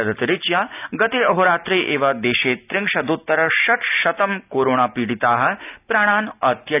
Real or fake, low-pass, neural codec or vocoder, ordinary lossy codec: real; 3.6 kHz; none; none